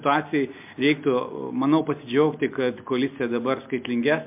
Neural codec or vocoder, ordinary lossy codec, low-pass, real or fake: none; MP3, 32 kbps; 3.6 kHz; real